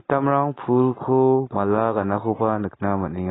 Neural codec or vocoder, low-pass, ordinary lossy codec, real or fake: none; 7.2 kHz; AAC, 16 kbps; real